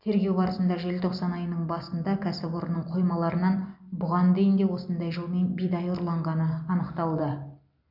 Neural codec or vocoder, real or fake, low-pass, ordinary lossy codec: none; real; 5.4 kHz; none